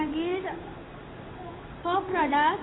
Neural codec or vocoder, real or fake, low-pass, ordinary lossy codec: codec, 16 kHz in and 24 kHz out, 1 kbps, XY-Tokenizer; fake; 7.2 kHz; AAC, 16 kbps